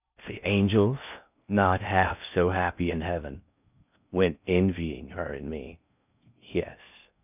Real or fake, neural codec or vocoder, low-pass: fake; codec, 16 kHz in and 24 kHz out, 0.6 kbps, FocalCodec, streaming, 4096 codes; 3.6 kHz